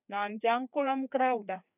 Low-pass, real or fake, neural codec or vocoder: 3.6 kHz; fake; codec, 16 kHz, 2 kbps, FreqCodec, larger model